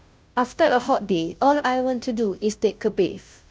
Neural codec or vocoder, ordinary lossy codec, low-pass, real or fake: codec, 16 kHz, 0.5 kbps, FunCodec, trained on Chinese and English, 25 frames a second; none; none; fake